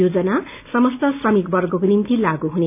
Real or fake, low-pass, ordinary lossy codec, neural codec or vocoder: real; 3.6 kHz; none; none